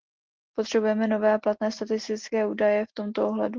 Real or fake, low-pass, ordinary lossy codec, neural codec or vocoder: real; 7.2 kHz; Opus, 16 kbps; none